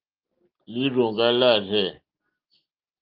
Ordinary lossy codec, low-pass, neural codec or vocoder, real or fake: Opus, 24 kbps; 5.4 kHz; none; real